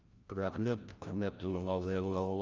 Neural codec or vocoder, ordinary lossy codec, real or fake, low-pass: codec, 16 kHz, 0.5 kbps, FreqCodec, larger model; Opus, 24 kbps; fake; 7.2 kHz